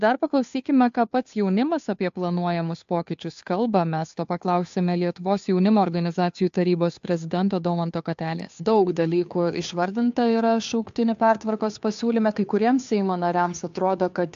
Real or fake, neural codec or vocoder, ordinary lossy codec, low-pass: fake; codec, 16 kHz, 2 kbps, FunCodec, trained on Chinese and English, 25 frames a second; AAC, 64 kbps; 7.2 kHz